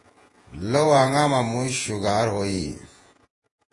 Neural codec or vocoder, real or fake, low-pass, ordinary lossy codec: vocoder, 48 kHz, 128 mel bands, Vocos; fake; 10.8 kHz; AAC, 32 kbps